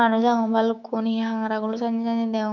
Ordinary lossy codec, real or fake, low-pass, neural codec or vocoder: none; fake; 7.2 kHz; codec, 16 kHz, 6 kbps, DAC